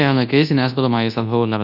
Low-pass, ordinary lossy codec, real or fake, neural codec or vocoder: 5.4 kHz; none; fake; codec, 24 kHz, 0.9 kbps, WavTokenizer, large speech release